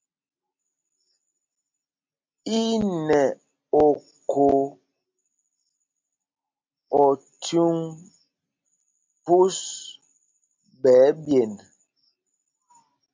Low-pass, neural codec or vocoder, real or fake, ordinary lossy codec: 7.2 kHz; none; real; MP3, 48 kbps